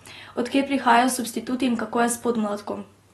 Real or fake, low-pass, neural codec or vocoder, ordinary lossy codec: real; 19.8 kHz; none; AAC, 32 kbps